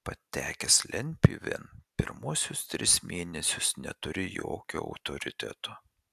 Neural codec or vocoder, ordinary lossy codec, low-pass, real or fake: none; AAC, 96 kbps; 14.4 kHz; real